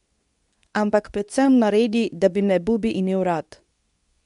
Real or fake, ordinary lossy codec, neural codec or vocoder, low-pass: fake; none; codec, 24 kHz, 0.9 kbps, WavTokenizer, medium speech release version 2; 10.8 kHz